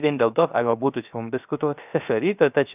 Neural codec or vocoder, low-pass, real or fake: codec, 16 kHz, 0.3 kbps, FocalCodec; 3.6 kHz; fake